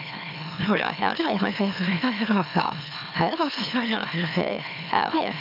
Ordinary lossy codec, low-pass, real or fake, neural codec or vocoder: none; 5.4 kHz; fake; autoencoder, 44.1 kHz, a latent of 192 numbers a frame, MeloTTS